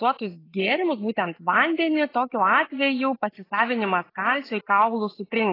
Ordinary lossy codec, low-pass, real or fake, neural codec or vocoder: AAC, 24 kbps; 5.4 kHz; real; none